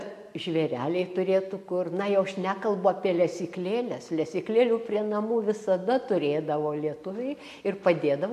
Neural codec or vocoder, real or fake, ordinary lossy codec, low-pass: none; real; MP3, 64 kbps; 14.4 kHz